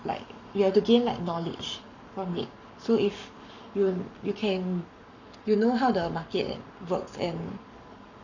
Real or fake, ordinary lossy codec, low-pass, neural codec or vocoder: fake; none; 7.2 kHz; codec, 44.1 kHz, 7.8 kbps, Pupu-Codec